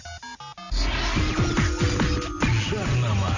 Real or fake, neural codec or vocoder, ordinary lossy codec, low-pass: real; none; none; 7.2 kHz